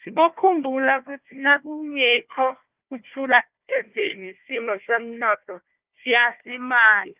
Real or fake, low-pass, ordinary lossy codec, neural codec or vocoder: fake; 3.6 kHz; Opus, 24 kbps; codec, 16 kHz, 1 kbps, FunCodec, trained on Chinese and English, 50 frames a second